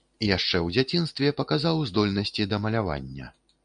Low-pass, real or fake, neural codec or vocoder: 9.9 kHz; real; none